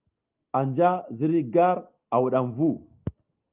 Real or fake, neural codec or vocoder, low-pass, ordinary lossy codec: real; none; 3.6 kHz; Opus, 24 kbps